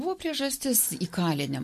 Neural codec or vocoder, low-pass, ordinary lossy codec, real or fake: none; 14.4 kHz; MP3, 64 kbps; real